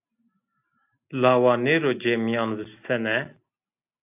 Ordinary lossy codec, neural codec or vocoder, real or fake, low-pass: AAC, 32 kbps; none; real; 3.6 kHz